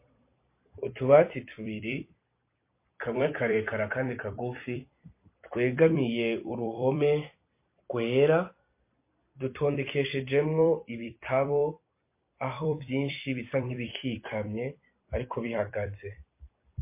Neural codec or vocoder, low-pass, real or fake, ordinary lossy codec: vocoder, 44.1 kHz, 128 mel bands every 256 samples, BigVGAN v2; 3.6 kHz; fake; MP3, 24 kbps